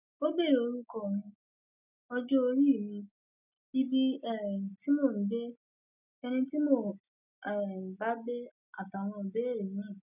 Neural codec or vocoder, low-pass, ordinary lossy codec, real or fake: none; 3.6 kHz; none; real